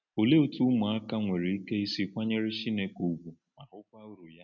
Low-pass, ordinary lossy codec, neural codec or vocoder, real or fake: 7.2 kHz; none; none; real